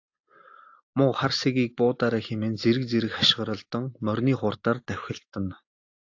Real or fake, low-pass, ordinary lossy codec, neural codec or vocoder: real; 7.2 kHz; AAC, 48 kbps; none